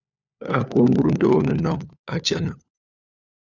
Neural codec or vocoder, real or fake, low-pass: codec, 16 kHz, 4 kbps, FunCodec, trained on LibriTTS, 50 frames a second; fake; 7.2 kHz